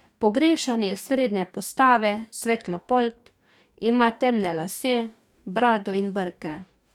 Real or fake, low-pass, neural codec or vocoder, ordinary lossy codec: fake; 19.8 kHz; codec, 44.1 kHz, 2.6 kbps, DAC; none